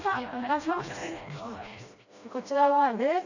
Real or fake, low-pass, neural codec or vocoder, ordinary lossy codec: fake; 7.2 kHz; codec, 16 kHz, 1 kbps, FreqCodec, smaller model; none